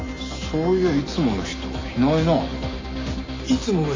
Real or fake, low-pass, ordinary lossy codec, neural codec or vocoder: real; 7.2 kHz; AAC, 32 kbps; none